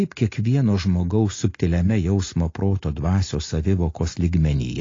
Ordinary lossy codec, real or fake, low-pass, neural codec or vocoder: AAC, 32 kbps; real; 7.2 kHz; none